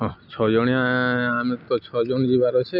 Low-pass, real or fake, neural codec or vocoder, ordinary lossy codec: 5.4 kHz; real; none; none